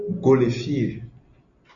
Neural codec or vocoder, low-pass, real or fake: none; 7.2 kHz; real